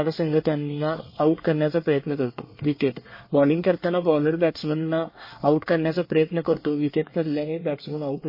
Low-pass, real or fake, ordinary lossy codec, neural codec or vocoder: 5.4 kHz; fake; MP3, 24 kbps; codec, 24 kHz, 1 kbps, SNAC